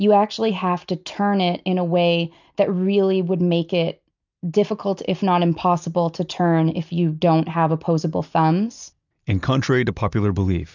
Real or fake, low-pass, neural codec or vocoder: real; 7.2 kHz; none